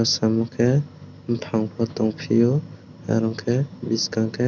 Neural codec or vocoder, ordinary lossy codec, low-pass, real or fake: none; none; 7.2 kHz; real